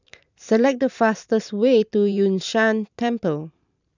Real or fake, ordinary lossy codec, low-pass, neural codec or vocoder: fake; none; 7.2 kHz; vocoder, 44.1 kHz, 128 mel bands every 512 samples, BigVGAN v2